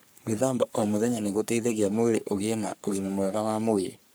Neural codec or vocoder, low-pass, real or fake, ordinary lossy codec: codec, 44.1 kHz, 3.4 kbps, Pupu-Codec; none; fake; none